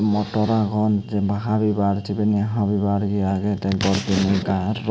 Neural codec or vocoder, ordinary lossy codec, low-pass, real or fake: none; none; none; real